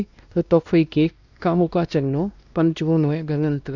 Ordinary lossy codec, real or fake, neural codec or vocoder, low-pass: none; fake; codec, 16 kHz, 1 kbps, X-Codec, WavLM features, trained on Multilingual LibriSpeech; 7.2 kHz